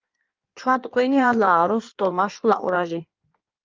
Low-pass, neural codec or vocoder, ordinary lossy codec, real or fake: 7.2 kHz; codec, 16 kHz in and 24 kHz out, 1.1 kbps, FireRedTTS-2 codec; Opus, 24 kbps; fake